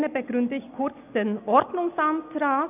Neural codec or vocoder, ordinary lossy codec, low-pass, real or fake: none; none; 3.6 kHz; real